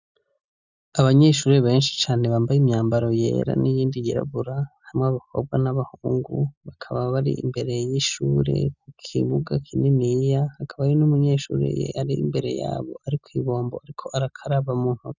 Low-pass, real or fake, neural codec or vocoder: 7.2 kHz; real; none